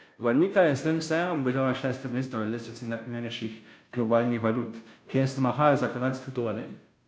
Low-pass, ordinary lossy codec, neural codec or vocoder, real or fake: none; none; codec, 16 kHz, 0.5 kbps, FunCodec, trained on Chinese and English, 25 frames a second; fake